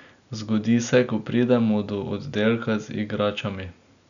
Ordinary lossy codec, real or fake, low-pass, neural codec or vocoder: none; real; 7.2 kHz; none